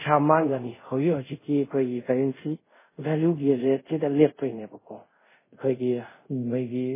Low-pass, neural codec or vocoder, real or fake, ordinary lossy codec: 3.6 kHz; codec, 24 kHz, 0.5 kbps, DualCodec; fake; MP3, 16 kbps